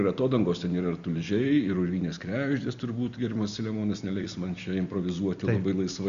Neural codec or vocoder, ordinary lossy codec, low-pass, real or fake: none; Opus, 64 kbps; 7.2 kHz; real